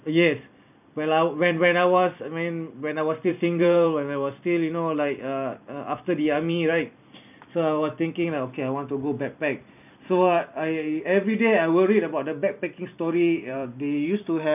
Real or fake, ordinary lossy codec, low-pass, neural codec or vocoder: fake; none; 3.6 kHz; vocoder, 44.1 kHz, 128 mel bands every 256 samples, BigVGAN v2